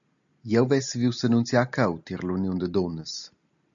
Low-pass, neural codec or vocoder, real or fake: 7.2 kHz; none; real